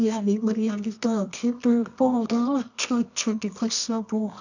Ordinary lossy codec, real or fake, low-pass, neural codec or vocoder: none; fake; 7.2 kHz; codec, 24 kHz, 0.9 kbps, WavTokenizer, medium music audio release